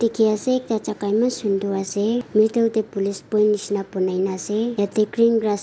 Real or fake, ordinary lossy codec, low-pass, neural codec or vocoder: real; none; none; none